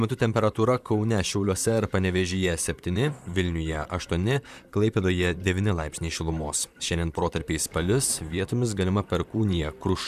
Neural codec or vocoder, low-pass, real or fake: vocoder, 44.1 kHz, 128 mel bands, Pupu-Vocoder; 14.4 kHz; fake